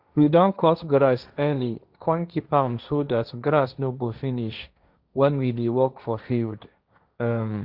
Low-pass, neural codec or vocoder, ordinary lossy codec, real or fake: 5.4 kHz; codec, 16 kHz, 1.1 kbps, Voila-Tokenizer; Opus, 64 kbps; fake